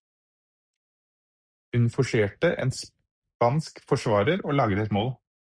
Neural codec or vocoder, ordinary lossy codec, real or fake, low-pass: none; Opus, 64 kbps; real; 9.9 kHz